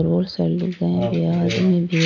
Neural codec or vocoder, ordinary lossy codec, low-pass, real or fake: none; none; 7.2 kHz; real